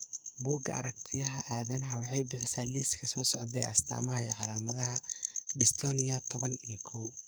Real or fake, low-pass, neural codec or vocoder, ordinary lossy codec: fake; none; codec, 44.1 kHz, 2.6 kbps, SNAC; none